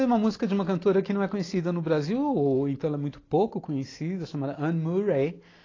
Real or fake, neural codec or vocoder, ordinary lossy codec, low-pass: real; none; AAC, 32 kbps; 7.2 kHz